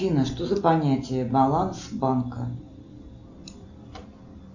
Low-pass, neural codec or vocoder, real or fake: 7.2 kHz; none; real